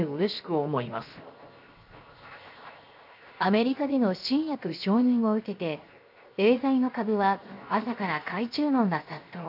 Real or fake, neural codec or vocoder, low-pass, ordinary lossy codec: fake; codec, 16 kHz, 0.7 kbps, FocalCodec; 5.4 kHz; none